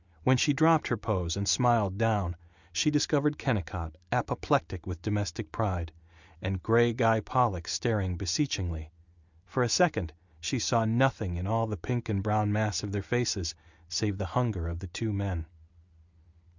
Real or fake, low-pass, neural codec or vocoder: real; 7.2 kHz; none